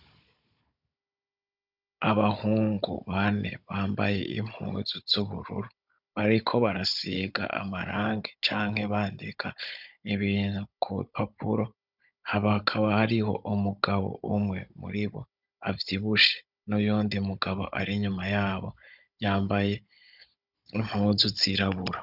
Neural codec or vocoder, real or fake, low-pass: codec, 16 kHz, 16 kbps, FunCodec, trained on Chinese and English, 50 frames a second; fake; 5.4 kHz